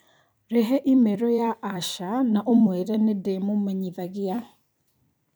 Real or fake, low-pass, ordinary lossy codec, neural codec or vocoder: fake; none; none; vocoder, 44.1 kHz, 128 mel bands every 256 samples, BigVGAN v2